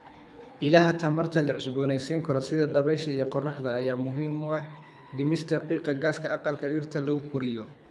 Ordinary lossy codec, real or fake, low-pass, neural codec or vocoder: none; fake; none; codec, 24 kHz, 3 kbps, HILCodec